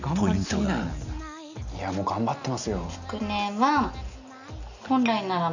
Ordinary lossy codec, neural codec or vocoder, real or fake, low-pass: none; none; real; 7.2 kHz